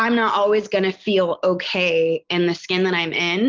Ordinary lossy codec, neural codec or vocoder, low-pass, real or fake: Opus, 24 kbps; none; 7.2 kHz; real